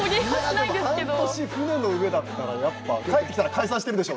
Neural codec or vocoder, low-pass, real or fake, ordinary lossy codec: none; none; real; none